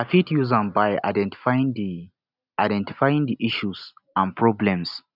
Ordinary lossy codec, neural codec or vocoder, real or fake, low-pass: none; none; real; 5.4 kHz